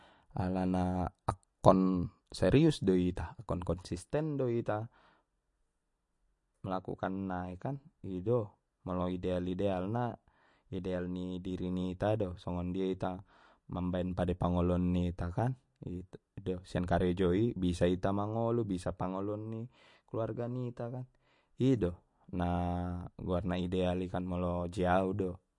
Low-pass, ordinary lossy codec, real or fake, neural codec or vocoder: 10.8 kHz; MP3, 48 kbps; real; none